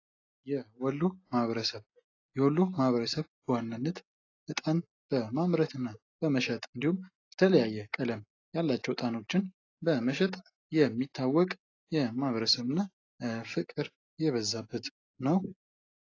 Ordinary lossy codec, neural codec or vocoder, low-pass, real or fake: AAC, 32 kbps; none; 7.2 kHz; real